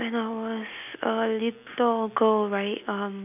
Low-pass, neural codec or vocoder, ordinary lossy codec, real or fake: 3.6 kHz; none; none; real